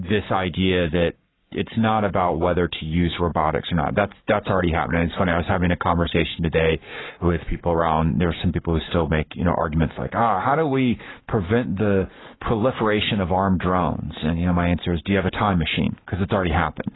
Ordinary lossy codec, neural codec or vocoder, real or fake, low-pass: AAC, 16 kbps; codec, 44.1 kHz, 7.8 kbps, Pupu-Codec; fake; 7.2 kHz